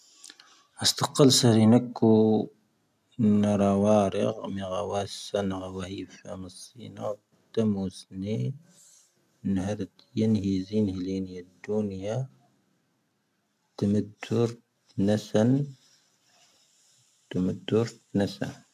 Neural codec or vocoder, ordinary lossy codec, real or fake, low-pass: none; MP3, 96 kbps; real; 14.4 kHz